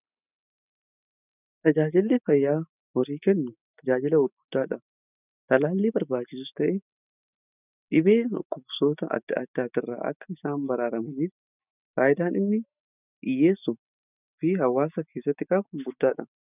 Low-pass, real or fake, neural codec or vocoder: 3.6 kHz; real; none